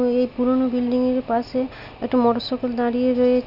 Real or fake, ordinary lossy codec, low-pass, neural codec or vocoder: real; none; 5.4 kHz; none